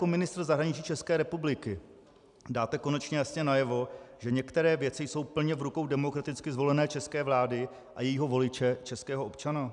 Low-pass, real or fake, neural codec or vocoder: 10.8 kHz; real; none